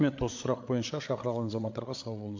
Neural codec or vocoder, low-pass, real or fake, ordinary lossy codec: codec, 16 kHz, 8 kbps, FreqCodec, larger model; 7.2 kHz; fake; MP3, 48 kbps